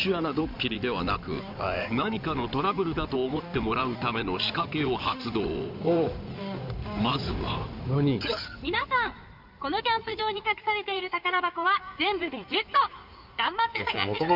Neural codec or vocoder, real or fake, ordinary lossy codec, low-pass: codec, 16 kHz in and 24 kHz out, 2.2 kbps, FireRedTTS-2 codec; fake; none; 5.4 kHz